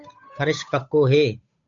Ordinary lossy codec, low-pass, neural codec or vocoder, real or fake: MP3, 64 kbps; 7.2 kHz; codec, 16 kHz, 8 kbps, FunCodec, trained on Chinese and English, 25 frames a second; fake